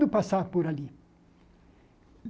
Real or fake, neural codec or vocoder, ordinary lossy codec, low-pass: real; none; none; none